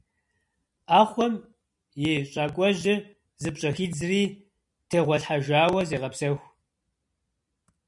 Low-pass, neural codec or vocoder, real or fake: 10.8 kHz; none; real